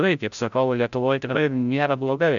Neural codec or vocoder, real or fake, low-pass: codec, 16 kHz, 0.5 kbps, FreqCodec, larger model; fake; 7.2 kHz